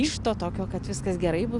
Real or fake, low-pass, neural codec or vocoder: real; 10.8 kHz; none